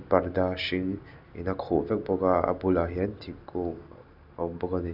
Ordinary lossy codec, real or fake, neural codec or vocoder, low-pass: none; real; none; 5.4 kHz